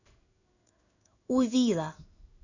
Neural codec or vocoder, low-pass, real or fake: codec, 16 kHz in and 24 kHz out, 1 kbps, XY-Tokenizer; 7.2 kHz; fake